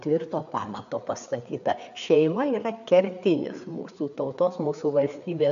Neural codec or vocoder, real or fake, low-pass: codec, 16 kHz, 4 kbps, FreqCodec, larger model; fake; 7.2 kHz